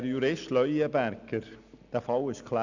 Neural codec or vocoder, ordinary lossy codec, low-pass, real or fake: none; none; 7.2 kHz; real